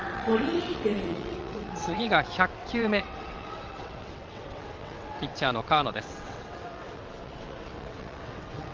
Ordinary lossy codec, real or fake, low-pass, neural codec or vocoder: Opus, 24 kbps; fake; 7.2 kHz; vocoder, 22.05 kHz, 80 mel bands, WaveNeXt